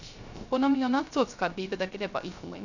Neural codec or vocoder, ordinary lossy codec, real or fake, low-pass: codec, 16 kHz, 0.3 kbps, FocalCodec; none; fake; 7.2 kHz